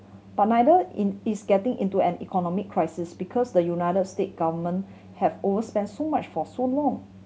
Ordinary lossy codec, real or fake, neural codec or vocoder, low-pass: none; real; none; none